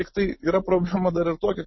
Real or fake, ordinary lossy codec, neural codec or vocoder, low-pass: real; MP3, 24 kbps; none; 7.2 kHz